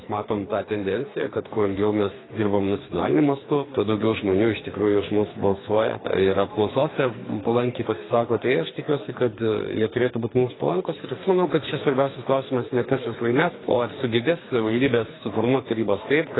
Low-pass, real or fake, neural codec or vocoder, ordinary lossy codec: 7.2 kHz; fake; codec, 44.1 kHz, 2.6 kbps, SNAC; AAC, 16 kbps